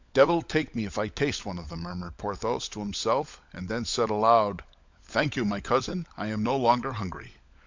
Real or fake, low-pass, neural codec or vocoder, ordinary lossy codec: fake; 7.2 kHz; codec, 16 kHz, 16 kbps, FunCodec, trained on LibriTTS, 50 frames a second; MP3, 64 kbps